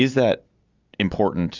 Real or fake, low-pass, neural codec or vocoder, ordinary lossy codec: real; 7.2 kHz; none; Opus, 64 kbps